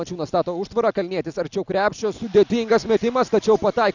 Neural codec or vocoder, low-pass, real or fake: none; 7.2 kHz; real